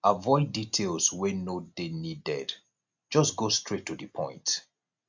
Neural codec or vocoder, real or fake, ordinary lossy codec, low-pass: none; real; MP3, 64 kbps; 7.2 kHz